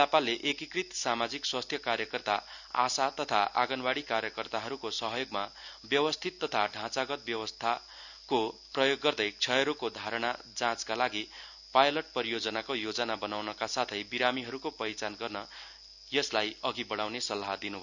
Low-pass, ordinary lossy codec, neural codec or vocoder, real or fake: 7.2 kHz; none; none; real